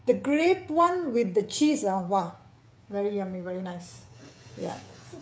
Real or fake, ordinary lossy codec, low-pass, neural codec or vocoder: fake; none; none; codec, 16 kHz, 16 kbps, FreqCodec, smaller model